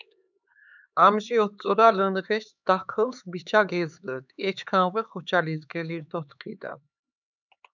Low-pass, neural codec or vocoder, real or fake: 7.2 kHz; codec, 16 kHz, 4 kbps, X-Codec, HuBERT features, trained on LibriSpeech; fake